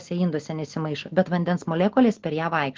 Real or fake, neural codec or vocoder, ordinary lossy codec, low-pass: real; none; Opus, 16 kbps; 7.2 kHz